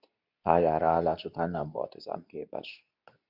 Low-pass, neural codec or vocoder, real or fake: 5.4 kHz; codec, 24 kHz, 0.9 kbps, WavTokenizer, medium speech release version 2; fake